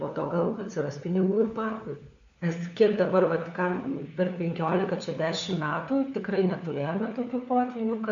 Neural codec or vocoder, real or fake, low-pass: codec, 16 kHz, 4 kbps, FunCodec, trained on LibriTTS, 50 frames a second; fake; 7.2 kHz